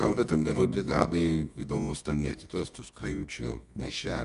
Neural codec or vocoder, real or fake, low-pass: codec, 24 kHz, 0.9 kbps, WavTokenizer, medium music audio release; fake; 10.8 kHz